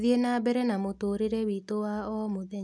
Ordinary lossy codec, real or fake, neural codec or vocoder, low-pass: none; real; none; none